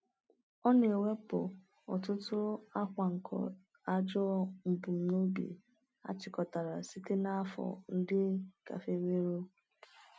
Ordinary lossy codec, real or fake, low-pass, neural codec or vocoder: none; real; none; none